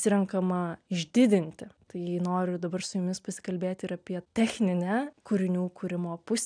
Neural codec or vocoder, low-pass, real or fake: none; 9.9 kHz; real